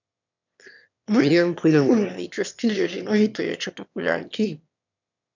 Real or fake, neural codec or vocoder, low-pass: fake; autoencoder, 22.05 kHz, a latent of 192 numbers a frame, VITS, trained on one speaker; 7.2 kHz